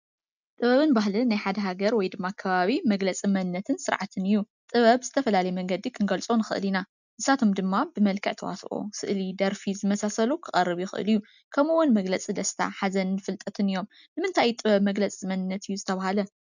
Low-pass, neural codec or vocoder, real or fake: 7.2 kHz; none; real